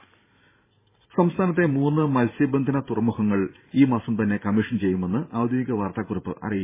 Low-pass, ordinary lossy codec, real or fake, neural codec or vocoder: 3.6 kHz; MP3, 16 kbps; fake; vocoder, 44.1 kHz, 128 mel bands every 512 samples, BigVGAN v2